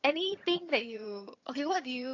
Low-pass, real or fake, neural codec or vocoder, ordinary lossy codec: 7.2 kHz; fake; vocoder, 22.05 kHz, 80 mel bands, HiFi-GAN; AAC, 48 kbps